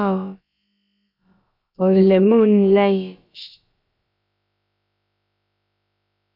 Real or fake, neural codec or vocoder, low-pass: fake; codec, 16 kHz, about 1 kbps, DyCAST, with the encoder's durations; 5.4 kHz